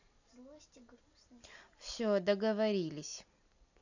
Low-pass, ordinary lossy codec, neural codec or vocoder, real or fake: 7.2 kHz; none; none; real